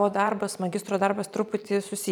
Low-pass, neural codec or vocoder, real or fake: 19.8 kHz; vocoder, 44.1 kHz, 128 mel bands every 512 samples, BigVGAN v2; fake